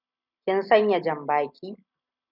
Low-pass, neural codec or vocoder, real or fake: 5.4 kHz; none; real